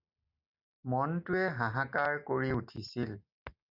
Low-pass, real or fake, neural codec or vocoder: 5.4 kHz; real; none